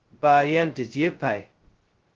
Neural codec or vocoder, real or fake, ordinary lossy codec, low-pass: codec, 16 kHz, 0.2 kbps, FocalCodec; fake; Opus, 16 kbps; 7.2 kHz